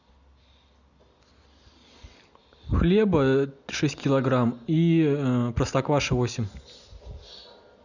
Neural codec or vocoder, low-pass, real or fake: none; 7.2 kHz; real